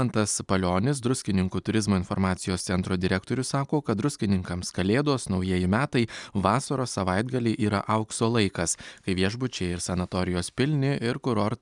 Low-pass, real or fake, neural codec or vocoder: 10.8 kHz; real; none